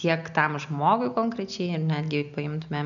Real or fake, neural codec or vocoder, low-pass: real; none; 7.2 kHz